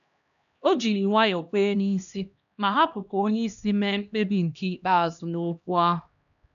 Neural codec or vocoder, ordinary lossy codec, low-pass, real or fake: codec, 16 kHz, 1 kbps, X-Codec, HuBERT features, trained on LibriSpeech; none; 7.2 kHz; fake